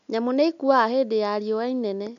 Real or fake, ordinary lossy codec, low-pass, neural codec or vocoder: real; none; 7.2 kHz; none